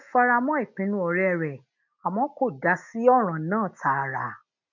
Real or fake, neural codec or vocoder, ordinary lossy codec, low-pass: real; none; none; 7.2 kHz